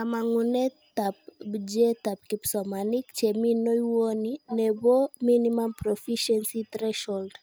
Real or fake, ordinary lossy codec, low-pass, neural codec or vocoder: real; none; none; none